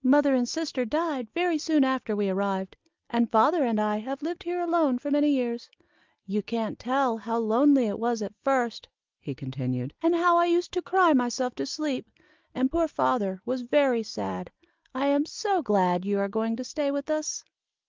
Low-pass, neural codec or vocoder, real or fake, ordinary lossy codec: 7.2 kHz; none; real; Opus, 32 kbps